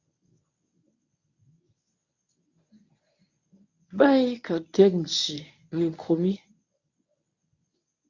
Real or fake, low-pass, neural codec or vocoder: fake; 7.2 kHz; codec, 24 kHz, 0.9 kbps, WavTokenizer, medium speech release version 1